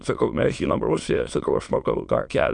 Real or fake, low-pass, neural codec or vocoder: fake; 9.9 kHz; autoencoder, 22.05 kHz, a latent of 192 numbers a frame, VITS, trained on many speakers